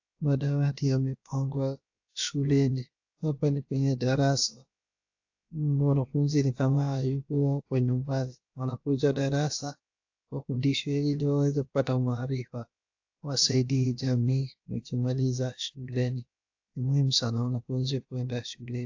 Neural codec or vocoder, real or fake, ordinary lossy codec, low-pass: codec, 16 kHz, about 1 kbps, DyCAST, with the encoder's durations; fake; AAC, 48 kbps; 7.2 kHz